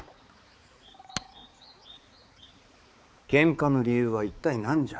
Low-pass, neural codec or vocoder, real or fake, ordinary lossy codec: none; codec, 16 kHz, 4 kbps, X-Codec, HuBERT features, trained on balanced general audio; fake; none